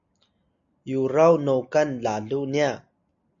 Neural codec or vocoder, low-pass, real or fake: none; 7.2 kHz; real